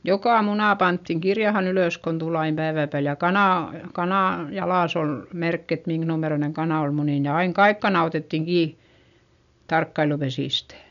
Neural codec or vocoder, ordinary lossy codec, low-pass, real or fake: none; none; 7.2 kHz; real